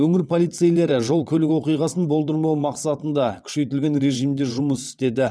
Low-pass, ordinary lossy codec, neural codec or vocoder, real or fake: none; none; vocoder, 22.05 kHz, 80 mel bands, WaveNeXt; fake